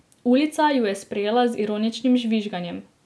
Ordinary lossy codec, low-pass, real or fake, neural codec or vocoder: none; none; real; none